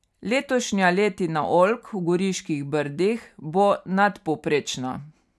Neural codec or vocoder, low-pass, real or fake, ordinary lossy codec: none; none; real; none